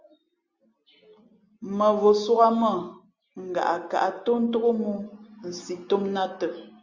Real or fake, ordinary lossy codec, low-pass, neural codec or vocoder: real; Opus, 64 kbps; 7.2 kHz; none